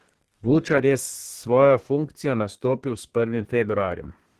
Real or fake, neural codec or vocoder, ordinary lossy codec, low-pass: fake; codec, 32 kHz, 1.9 kbps, SNAC; Opus, 16 kbps; 14.4 kHz